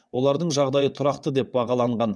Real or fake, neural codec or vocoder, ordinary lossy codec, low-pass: fake; vocoder, 22.05 kHz, 80 mel bands, WaveNeXt; none; none